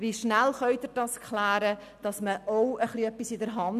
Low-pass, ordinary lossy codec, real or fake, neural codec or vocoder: 14.4 kHz; none; real; none